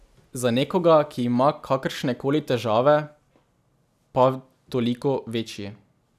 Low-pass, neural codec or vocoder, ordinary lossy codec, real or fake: 14.4 kHz; none; none; real